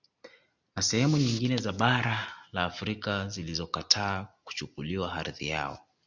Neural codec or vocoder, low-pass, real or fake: none; 7.2 kHz; real